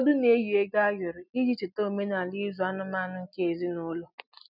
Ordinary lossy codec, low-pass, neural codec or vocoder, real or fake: none; 5.4 kHz; none; real